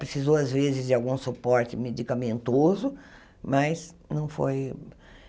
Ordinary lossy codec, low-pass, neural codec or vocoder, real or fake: none; none; none; real